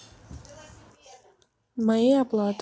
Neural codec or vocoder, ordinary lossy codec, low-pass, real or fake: none; none; none; real